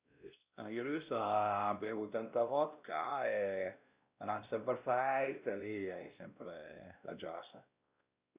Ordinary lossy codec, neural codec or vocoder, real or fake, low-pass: Opus, 64 kbps; codec, 16 kHz, 1 kbps, X-Codec, WavLM features, trained on Multilingual LibriSpeech; fake; 3.6 kHz